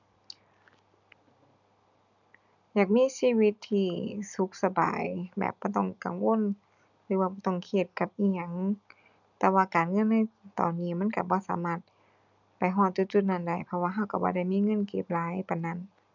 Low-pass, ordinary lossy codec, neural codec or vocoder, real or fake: 7.2 kHz; none; none; real